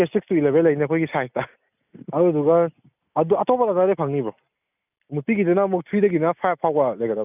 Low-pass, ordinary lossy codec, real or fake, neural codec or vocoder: 3.6 kHz; none; real; none